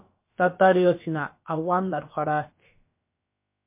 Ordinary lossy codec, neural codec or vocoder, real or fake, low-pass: MP3, 24 kbps; codec, 16 kHz, about 1 kbps, DyCAST, with the encoder's durations; fake; 3.6 kHz